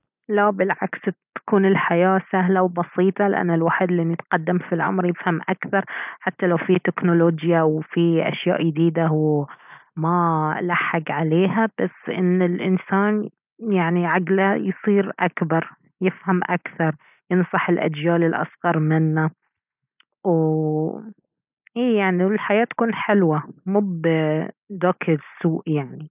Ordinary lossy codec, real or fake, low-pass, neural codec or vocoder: none; real; 3.6 kHz; none